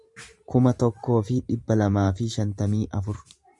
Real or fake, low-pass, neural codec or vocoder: fake; 10.8 kHz; vocoder, 44.1 kHz, 128 mel bands every 512 samples, BigVGAN v2